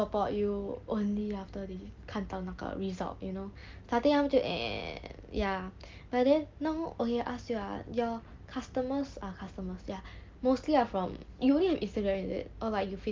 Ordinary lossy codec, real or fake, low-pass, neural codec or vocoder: Opus, 32 kbps; real; 7.2 kHz; none